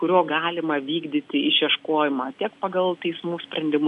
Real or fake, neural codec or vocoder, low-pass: real; none; 10.8 kHz